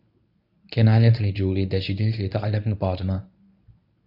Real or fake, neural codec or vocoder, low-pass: fake; codec, 24 kHz, 0.9 kbps, WavTokenizer, medium speech release version 1; 5.4 kHz